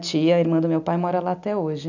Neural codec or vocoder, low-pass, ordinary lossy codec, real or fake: none; 7.2 kHz; none; real